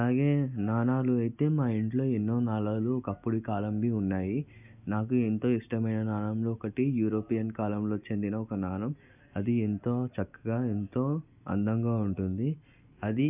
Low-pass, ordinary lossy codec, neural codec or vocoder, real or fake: 3.6 kHz; none; none; real